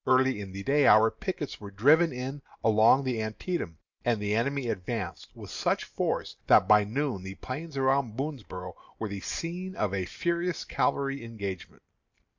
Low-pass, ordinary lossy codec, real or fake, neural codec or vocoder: 7.2 kHz; MP3, 64 kbps; real; none